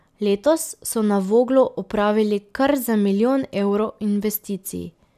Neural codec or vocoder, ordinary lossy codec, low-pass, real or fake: none; none; 14.4 kHz; real